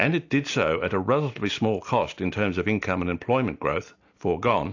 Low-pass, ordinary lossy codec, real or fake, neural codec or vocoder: 7.2 kHz; AAC, 48 kbps; real; none